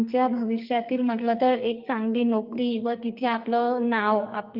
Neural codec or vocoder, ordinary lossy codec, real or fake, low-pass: codec, 44.1 kHz, 2.6 kbps, SNAC; Opus, 32 kbps; fake; 5.4 kHz